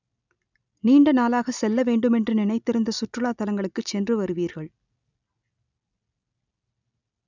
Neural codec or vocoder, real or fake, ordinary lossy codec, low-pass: none; real; none; 7.2 kHz